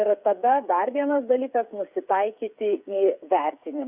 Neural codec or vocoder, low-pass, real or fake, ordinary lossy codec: codec, 16 kHz, 8 kbps, FreqCodec, smaller model; 3.6 kHz; fake; Opus, 64 kbps